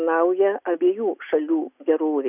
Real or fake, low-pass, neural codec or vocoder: real; 3.6 kHz; none